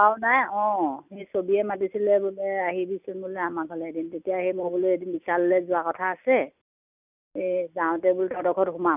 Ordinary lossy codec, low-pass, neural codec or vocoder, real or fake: none; 3.6 kHz; none; real